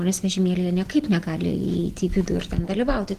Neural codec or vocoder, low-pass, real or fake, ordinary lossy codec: none; 14.4 kHz; real; Opus, 16 kbps